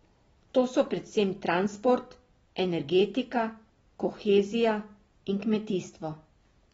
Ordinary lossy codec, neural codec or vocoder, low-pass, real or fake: AAC, 24 kbps; none; 19.8 kHz; real